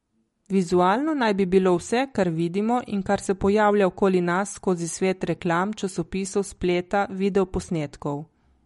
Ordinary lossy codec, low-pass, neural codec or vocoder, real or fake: MP3, 48 kbps; 19.8 kHz; none; real